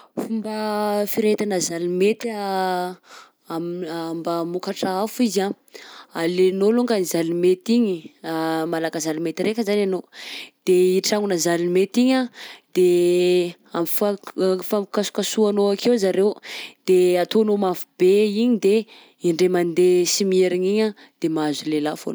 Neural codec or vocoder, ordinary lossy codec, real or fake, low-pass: none; none; real; none